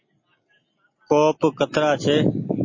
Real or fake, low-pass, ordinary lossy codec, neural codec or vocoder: real; 7.2 kHz; MP3, 32 kbps; none